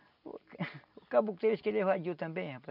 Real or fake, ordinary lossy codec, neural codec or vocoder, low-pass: real; none; none; 5.4 kHz